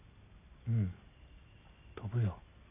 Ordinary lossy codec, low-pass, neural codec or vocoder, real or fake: none; 3.6 kHz; none; real